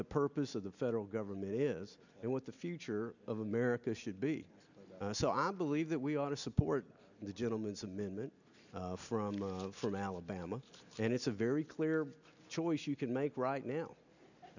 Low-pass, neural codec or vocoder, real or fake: 7.2 kHz; none; real